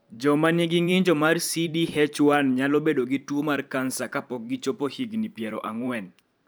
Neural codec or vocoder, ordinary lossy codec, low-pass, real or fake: vocoder, 44.1 kHz, 128 mel bands every 512 samples, BigVGAN v2; none; none; fake